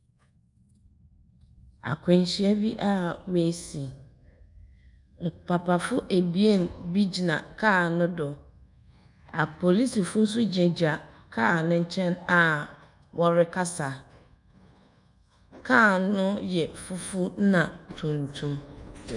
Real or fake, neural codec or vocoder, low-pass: fake; codec, 24 kHz, 1.2 kbps, DualCodec; 10.8 kHz